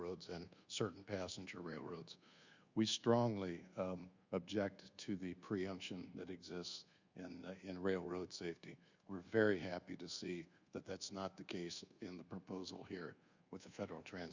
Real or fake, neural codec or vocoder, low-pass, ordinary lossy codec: fake; codec, 24 kHz, 1.2 kbps, DualCodec; 7.2 kHz; Opus, 64 kbps